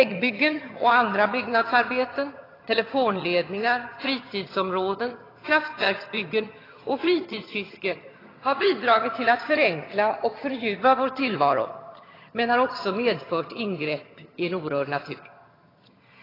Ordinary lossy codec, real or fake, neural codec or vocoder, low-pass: AAC, 24 kbps; fake; vocoder, 22.05 kHz, 80 mel bands, HiFi-GAN; 5.4 kHz